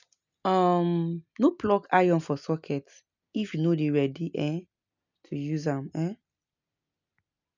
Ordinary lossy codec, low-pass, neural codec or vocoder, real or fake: none; 7.2 kHz; none; real